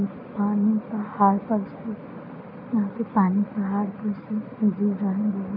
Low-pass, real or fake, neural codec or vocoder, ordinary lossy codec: 5.4 kHz; real; none; none